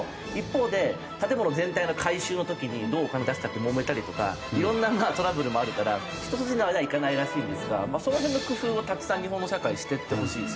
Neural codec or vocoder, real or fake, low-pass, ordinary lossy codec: none; real; none; none